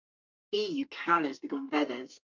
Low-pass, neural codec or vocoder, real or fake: 7.2 kHz; codec, 32 kHz, 1.9 kbps, SNAC; fake